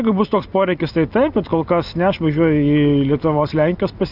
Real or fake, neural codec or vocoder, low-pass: real; none; 5.4 kHz